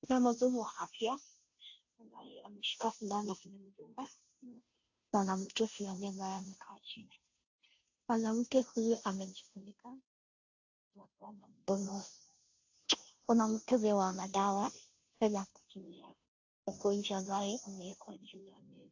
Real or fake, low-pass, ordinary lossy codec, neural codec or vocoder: fake; 7.2 kHz; AAC, 48 kbps; codec, 16 kHz, 0.5 kbps, FunCodec, trained on Chinese and English, 25 frames a second